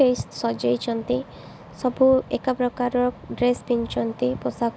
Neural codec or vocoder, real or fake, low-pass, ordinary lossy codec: none; real; none; none